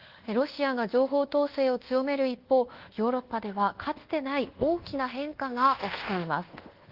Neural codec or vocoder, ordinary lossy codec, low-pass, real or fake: codec, 24 kHz, 1.2 kbps, DualCodec; Opus, 16 kbps; 5.4 kHz; fake